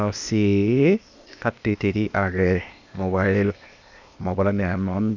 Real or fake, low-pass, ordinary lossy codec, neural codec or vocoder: fake; 7.2 kHz; none; codec, 16 kHz, 0.8 kbps, ZipCodec